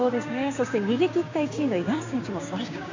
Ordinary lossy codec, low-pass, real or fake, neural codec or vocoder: AAC, 48 kbps; 7.2 kHz; fake; codec, 16 kHz, 6 kbps, DAC